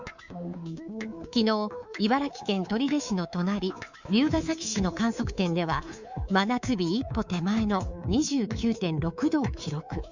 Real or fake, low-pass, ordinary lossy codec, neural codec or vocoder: fake; 7.2 kHz; Opus, 64 kbps; codec, 24 kHz, 3.1 kbps, DualCodec